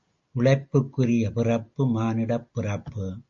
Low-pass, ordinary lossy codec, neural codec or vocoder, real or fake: 7.2 kHz; MP3, 48 kbps; none; real